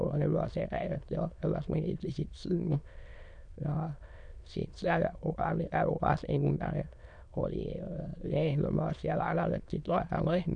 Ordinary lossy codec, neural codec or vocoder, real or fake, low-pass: none; autoencoder, 22.05 kHz, a latent of 192 numbers a frame, VITS, trained on many speakers; fake; 9.9 kHz